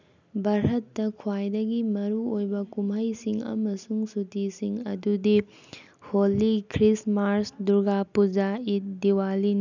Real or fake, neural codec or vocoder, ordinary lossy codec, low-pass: real; none; none; 7.2 kHz